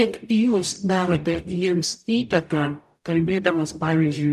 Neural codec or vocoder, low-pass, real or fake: codec, 44.1 kHz, 0.9 kbps, DAC; 14.4 kHz; fake